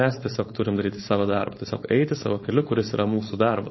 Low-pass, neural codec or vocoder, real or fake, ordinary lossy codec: 7.2 kHz; codec, 16 kHz, 4.8 kbps, FACodec; fake; MP3, 24 kbps